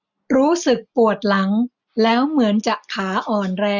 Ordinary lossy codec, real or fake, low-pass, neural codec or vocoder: none; real; 7.2 kHz; none